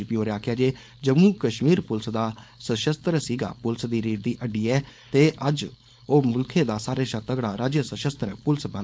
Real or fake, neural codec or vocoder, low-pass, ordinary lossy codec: fake; codec, 16 kHz, 4.8 kbps, FACodec; none; none